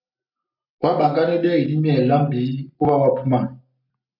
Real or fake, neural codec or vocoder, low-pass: real; none; 5.4 kHz